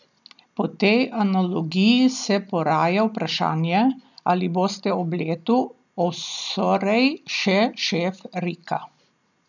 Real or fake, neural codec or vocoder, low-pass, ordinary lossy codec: real; none; 7.2 kHz; none